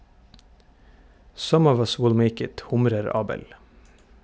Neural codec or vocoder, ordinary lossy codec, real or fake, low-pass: none; none; real; none